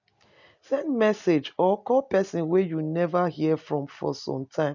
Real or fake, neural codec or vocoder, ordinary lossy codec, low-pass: real; none; none; 7.2 kHz